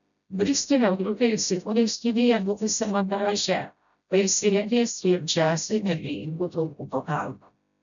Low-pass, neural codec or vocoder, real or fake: 7.2 kHz; codec, 16 kHz, 0.5 kbps, FreqCodec, smaller model; fake